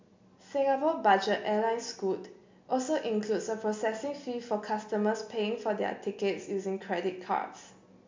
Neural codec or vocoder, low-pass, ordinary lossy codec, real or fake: none; 7.2 kHz; MP3, 48 kbps; real